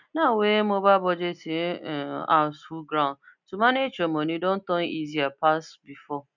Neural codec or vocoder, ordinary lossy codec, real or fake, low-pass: none; none; real; 7.2 kHz